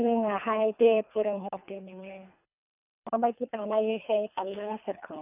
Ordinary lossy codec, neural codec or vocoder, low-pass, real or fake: none; codec, 24 kHz, 3 kbps, HILCodec; 3.6 kHz; fake